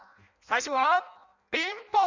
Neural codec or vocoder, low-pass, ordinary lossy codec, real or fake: codec, 16 kHz in and 24 kHz out, 0.6 kbps, FireRedTTS-2 codec; 7.2 kHz; none; fake